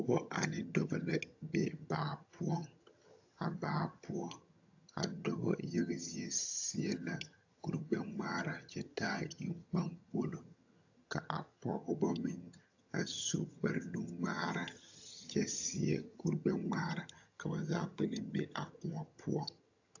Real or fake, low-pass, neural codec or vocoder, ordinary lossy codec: fake; 7.2 kHz; vocoder, 22.05 kHz, 80 mel bands, HiFi-GAN; AAC, 48 kbps